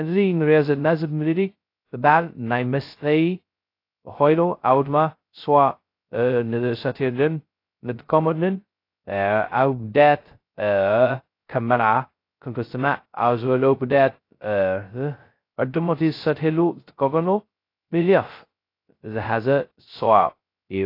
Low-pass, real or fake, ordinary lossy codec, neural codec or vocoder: 5.4 kHz; fake; AAC, 32 kbps; codec, 16 kHz, 0.2 kbps, FocalCodec